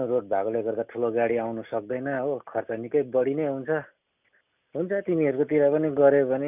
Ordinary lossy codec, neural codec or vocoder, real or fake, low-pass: none; none; real; 3.6 kHz